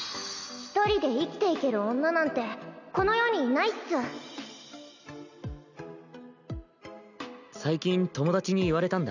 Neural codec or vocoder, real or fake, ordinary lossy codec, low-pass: none; real; none; 7.2 kHz